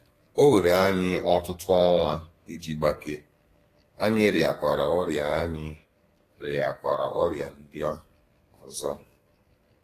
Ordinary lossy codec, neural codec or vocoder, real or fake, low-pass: AAC, 48 kbps; codec, 32 kHz, 1.9 kbps, SNAC; fake; 14.4 kHz